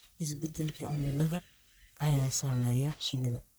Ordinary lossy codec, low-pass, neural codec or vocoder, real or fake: none; none; codec, 44.1 kHz, 1.7 kbps, Pupu-Codec; fake